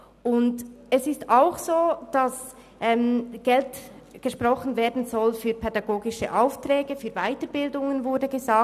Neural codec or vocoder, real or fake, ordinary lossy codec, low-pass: none; real; none; 14.4 kHz